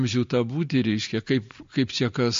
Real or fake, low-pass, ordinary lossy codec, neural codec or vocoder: real; 7.2 kHz; MP3, 48 kbps; none